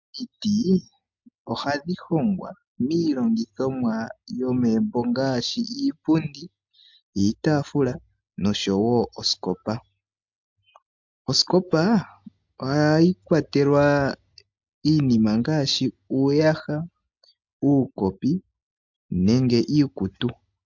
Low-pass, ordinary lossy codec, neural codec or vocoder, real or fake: 7.2 kHz; MP3, 64 kbps; none; real